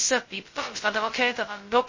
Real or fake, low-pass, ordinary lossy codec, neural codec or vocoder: fake; 7.2 kHz; MP3, 32 kbps; codec, 16 kHz, 0.2 kbps, FocalCodec